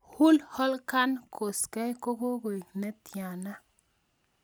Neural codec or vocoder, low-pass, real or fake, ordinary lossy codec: none; none; real; none